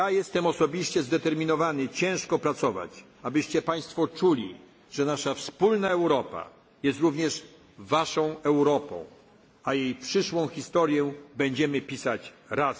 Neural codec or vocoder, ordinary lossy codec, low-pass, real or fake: none; none; none; real